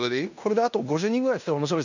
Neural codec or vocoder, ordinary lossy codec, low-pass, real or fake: codec, 16 kHz in and 24 kHz out, 0.9 kbps, LongCat-Audio-Codec, fine tuned four codebook decoder; none; 7.2 kHz; fake